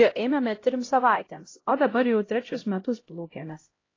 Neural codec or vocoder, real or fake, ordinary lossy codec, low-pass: codec, 16 kHz, 0.5 kbps, X-Codec, HuBERT features, trained on LibriSpeech; fake; AAC, 32 kbps; 7.2 kHz